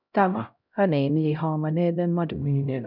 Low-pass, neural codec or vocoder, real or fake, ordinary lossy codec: 5.4 kHz; codec, 16 kHz, 0.5 kbps, X-Codec, HuBERT features, trained on LibriSpeech; fake; none